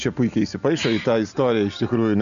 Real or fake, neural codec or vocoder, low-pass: real; none; 7.2 kHz